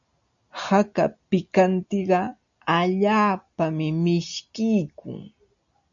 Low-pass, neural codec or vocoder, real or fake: 7.2 kHz; none; real